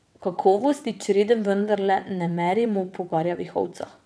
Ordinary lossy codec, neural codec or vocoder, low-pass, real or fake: none; vocoder, 22.05 kHz, 80 mel bands, WaveNeXt; none; fake